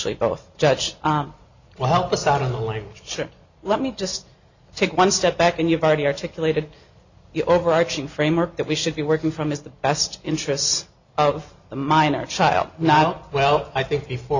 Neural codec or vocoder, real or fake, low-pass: none; real; 7.2 kHz